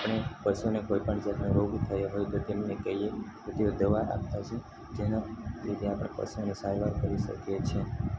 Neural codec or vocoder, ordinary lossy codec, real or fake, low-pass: none; none; real; none